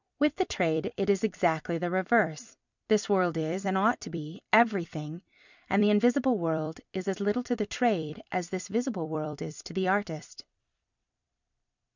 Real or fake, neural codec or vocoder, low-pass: fake; vocoder, 44.1 kHz, 128 mel bands every 256 samples, BigVGAN v2; 7.2 kHz